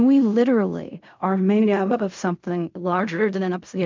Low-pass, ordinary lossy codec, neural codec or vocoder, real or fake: 7.2 kHz; MP3, 64 kbps; codec, 16 kHz in and 24 kHz out, 0.4 kbps, LongCat-Audio-Codec, fine tuned four codebook decoder; fake